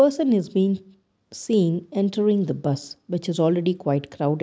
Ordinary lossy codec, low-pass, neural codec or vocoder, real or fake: none; none; none; real